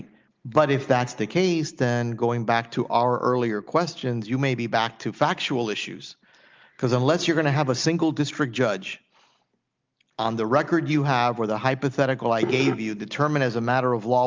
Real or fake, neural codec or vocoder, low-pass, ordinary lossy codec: real; none; 7.2 kHz; Opus, 32 kbps